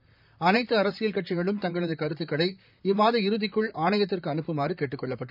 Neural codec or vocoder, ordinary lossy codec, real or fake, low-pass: vocoder, 44.1 kHz, 128 mel bands, Pupu-Vocoder; none; fake; 5.4 kHz